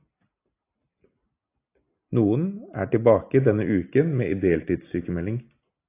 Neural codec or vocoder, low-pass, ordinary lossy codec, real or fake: none; 3.6 kHz; AAC, 24 kbps; real